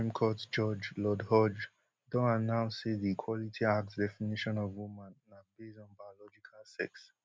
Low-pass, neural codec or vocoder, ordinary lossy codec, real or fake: none; none; none; real